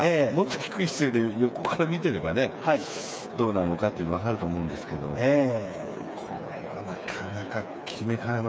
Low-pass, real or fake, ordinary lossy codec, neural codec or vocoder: none; fake; none; codec, 16 kHz, 4 kbps, FreqCodec, smaller model